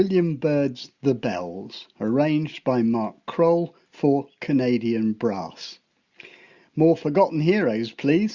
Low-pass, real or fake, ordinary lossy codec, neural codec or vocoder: 7.2 kHz; real; Opus, 64 kbps; none